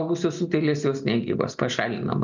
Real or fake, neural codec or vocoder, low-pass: real; none; 7.2 kHz